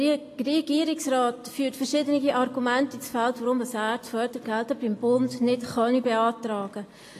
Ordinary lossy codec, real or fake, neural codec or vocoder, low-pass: AAC, 48 kbps; real; none; 14.4 kHz